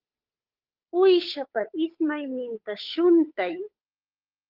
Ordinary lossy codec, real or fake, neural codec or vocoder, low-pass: Opus, 24 kbps; fake; codec, 16 kHz, 8 kbps, FunCodec, trained on Chinese and English, 25 frames a second; 5.4 kHz